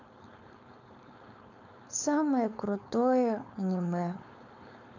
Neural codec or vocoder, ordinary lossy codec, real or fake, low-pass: codec, 16 kHz, 4.8 kbps, FACodec; none; fake; 7.2 kHz